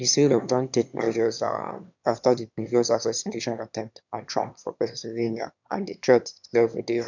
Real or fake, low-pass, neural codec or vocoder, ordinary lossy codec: fake; 7.2 kHz; autoencoder, 22.05 kHz, a latent of 192 numbers a frame, VITS, trained on one speaker; none